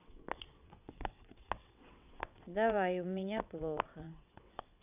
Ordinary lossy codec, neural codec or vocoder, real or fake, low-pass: none; vocoder, 22.05 kHz, 80 mel bands, WaveNeXt; fake; 3.6 kHz